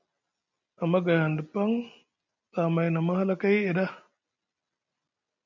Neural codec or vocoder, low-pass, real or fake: none; 7.2 kHz; real